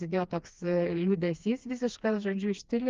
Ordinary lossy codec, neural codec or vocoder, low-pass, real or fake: Opus, 32 kbps; codec, 16 kHz, 2 kbps, FreqCodec, smaller model; 7.2 kHz; fake